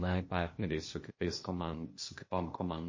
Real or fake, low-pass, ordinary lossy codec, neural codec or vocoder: fake; 7.2 kHz; MP3, 32 kbps; codec, 16 kHz, 0.8 kbps, ZipCodec